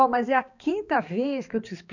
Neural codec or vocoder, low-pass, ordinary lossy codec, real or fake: codec, 16 kHz, 2 kbps, X-Codec, HuBERT features, trained on balanced general audio; 7.2 kHz; none; fake